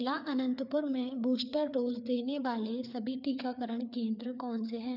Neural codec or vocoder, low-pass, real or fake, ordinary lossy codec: codec, 16 kHz, 4 kbps, FreqCodec, larger model; 5.4 kHz; fake; none